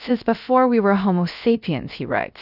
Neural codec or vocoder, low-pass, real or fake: codec, 16 kHz, 0.3 kbps, FocalCodec; 5.4 kHz; fake